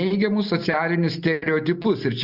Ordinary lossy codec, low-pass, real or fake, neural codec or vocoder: Opus, 64 kbps; 5.4 kHz; real; none